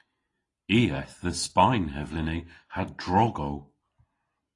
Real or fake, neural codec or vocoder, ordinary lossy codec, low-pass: real; none; AAC, 32 kbps; 10.8 kHz